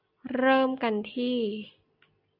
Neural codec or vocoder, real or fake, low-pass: none; real; 5.4 kHz